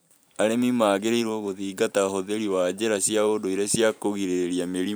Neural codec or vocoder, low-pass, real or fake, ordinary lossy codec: vocoder, 44.1 kHz, 128 mel bands every 512 samples, BigVGAN v2; none; fake; none